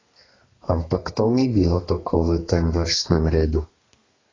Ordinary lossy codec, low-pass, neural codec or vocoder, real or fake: AAC, 32 kbps; 7.2 kHz; codec, 32 kHz, 1.9 kbps, SNAC; fake